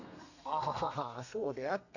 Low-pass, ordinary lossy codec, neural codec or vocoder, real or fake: 7.2 kHz; none; codec, 32 kHz, 1.9 kbps, SNAC; fake